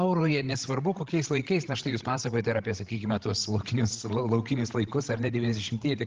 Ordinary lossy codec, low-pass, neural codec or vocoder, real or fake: Opus, 16 kbps; 7.2 kHz; codec, 16 kHz, 16 kbps, FreqCodec, larger model; fake